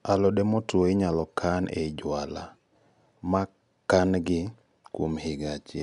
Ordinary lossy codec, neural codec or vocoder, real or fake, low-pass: none; none; real; 10.8 kHz